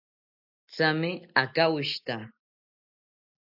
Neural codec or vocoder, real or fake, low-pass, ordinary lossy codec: none; real; 5.4 kHz; AAC, 48 kbps